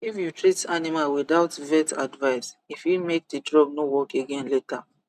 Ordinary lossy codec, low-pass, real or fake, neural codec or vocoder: none; 14.4 kHz; real; none